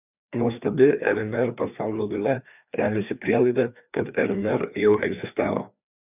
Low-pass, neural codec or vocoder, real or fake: 3.6 kHz; codec, 16 kHz, 2 kbps, FreqCodec, larger model; fake